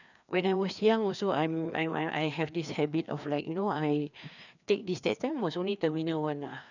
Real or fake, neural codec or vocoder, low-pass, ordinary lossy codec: fake; codec, 16 kHz, 2 kbps, FreqCodec, larger model; 7.2 kHz; none